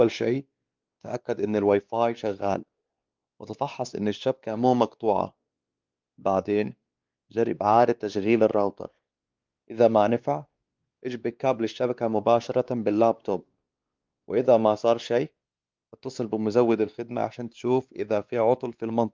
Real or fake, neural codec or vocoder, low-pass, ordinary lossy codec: fake; codec, 16 kHz, 2 kbps, X-Codec, WavLM features, trained on Multilingual LibriSpeech; 7.2 kHz; Opus, 32 kbps